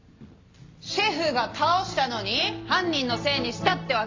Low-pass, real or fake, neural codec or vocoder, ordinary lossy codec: 7.2 kHz; fake; vocoder, 44.1 kHz, 128 mel bands every 256 samples, BigVGAN v2; MP3, 48 kbps